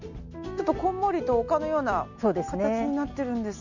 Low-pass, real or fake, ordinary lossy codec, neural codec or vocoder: 7.2 kHz; real; none; none